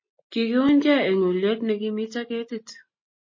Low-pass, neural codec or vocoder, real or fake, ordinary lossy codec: 7.2 kHz; none; real; MP3, 32 kbps